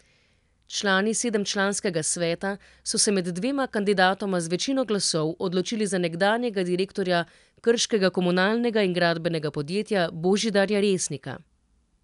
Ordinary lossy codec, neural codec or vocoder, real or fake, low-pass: none; none; real; 10.8 kHz